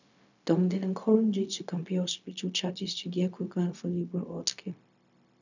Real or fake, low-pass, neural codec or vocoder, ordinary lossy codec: fake; 7.2 kHz; codec, 16 kHz, 0.4 kbps, LongCat-Audio-Codec; none